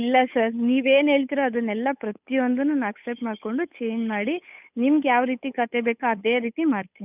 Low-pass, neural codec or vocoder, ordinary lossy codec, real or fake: 3.6 kHz; codec, 16 kHz, 8 kbps, FunCodec, trained on Chinese and English, 25 frames a second; none; fake